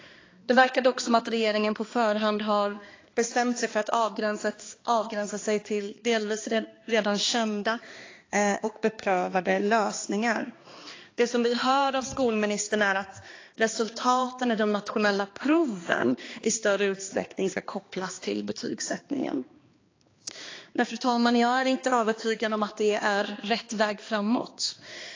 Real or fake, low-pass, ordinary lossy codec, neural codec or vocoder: fake; 7.2 kHz; AAC, 32 kbps; codec, 16 kHz, 2 kbps, X-Codec, HuBERT features, trained on balanced general audio